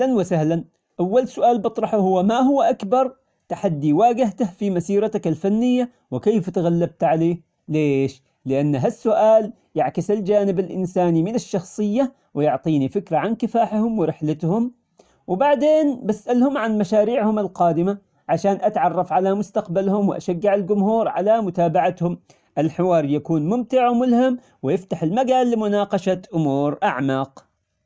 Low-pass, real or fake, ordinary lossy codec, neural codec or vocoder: none; real; none; none